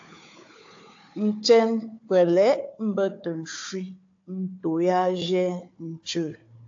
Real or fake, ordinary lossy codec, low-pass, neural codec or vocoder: fake; AAC, 48 kbps; 7.2 kHz; codec, 16 kHz, 4 kbps, FunCodec, trained on Chinese and English, 50 frames a second